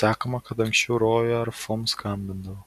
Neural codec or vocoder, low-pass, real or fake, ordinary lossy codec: none; 14.4 kHz; real; MP3, 96 kbps